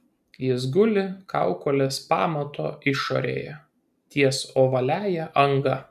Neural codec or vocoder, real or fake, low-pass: none; real; 14.4 kHz